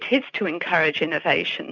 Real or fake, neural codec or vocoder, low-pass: real; none; 7.2 kHz